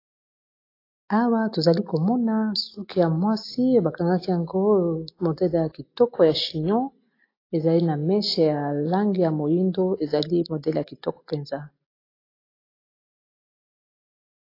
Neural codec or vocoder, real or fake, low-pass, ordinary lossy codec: none; real; 5.4 kHz; AAC, 32 kbps